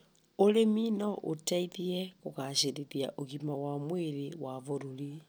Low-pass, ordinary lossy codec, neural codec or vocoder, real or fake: none; none; none; real